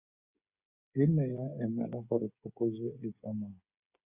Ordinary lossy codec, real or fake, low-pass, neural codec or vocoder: Opus, 64 kbps; fake; 3.6 kHz; codec, 16 kHz, 8 kbps, FreqCodec, smaller model